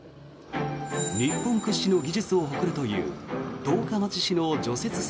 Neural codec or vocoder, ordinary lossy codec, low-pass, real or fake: none; none; none; real